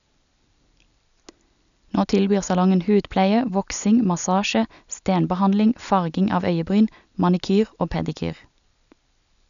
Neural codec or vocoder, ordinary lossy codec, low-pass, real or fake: none; none; 7.2 kHz; real